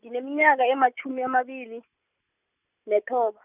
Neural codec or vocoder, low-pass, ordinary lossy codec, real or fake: none; 3.6 kHz; none; real